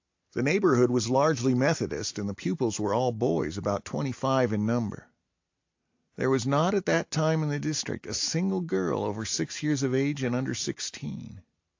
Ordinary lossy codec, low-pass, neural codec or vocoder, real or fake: AAC, 48 kbps; 7.2 kHz; none; real